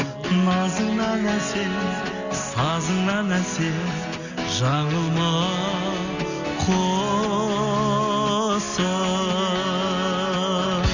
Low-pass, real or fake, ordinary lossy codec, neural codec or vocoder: 7.2 kHz; real; none; none